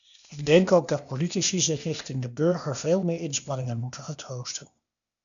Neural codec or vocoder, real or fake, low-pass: codec, 16 kHz, 0.8 kbps, ZipCodec; fake; 7.2 kHz